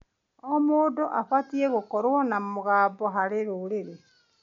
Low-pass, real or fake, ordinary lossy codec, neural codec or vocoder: 7.2 kHz; real; MP3, 48 kbps; none